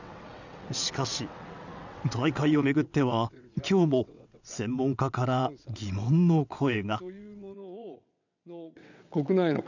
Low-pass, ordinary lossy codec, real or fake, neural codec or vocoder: 7.2 kHz; none; fake; vocoder, 22.05 kHz, 80 mel bands, WaveNeXt